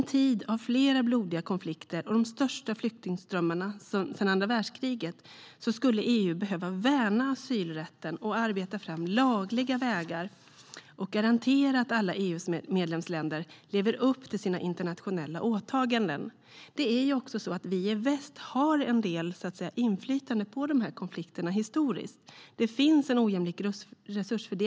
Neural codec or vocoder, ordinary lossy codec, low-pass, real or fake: none; none; none; real